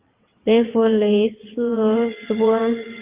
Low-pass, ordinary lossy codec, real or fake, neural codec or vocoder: 3.6 kHz; Opus, 32 kbps; fake; vocoder, 22.05 kHz, 80 mel bands, WaveNeXt